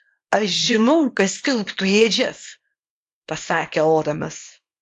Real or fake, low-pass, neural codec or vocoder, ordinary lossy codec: fake; 10.8 kHz; codec, 24 kHz, 0.9 kbps, WavTokenizer, small release; AAC, 64 kbps